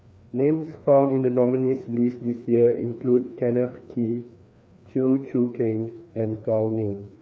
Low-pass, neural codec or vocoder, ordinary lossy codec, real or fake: none; codec, 16 kHz, 2 kbps, FreqCodec, larger model; none; fake